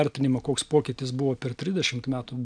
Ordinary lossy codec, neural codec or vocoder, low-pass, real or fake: MP3, 96 kbps; none; 9.9 kHz; real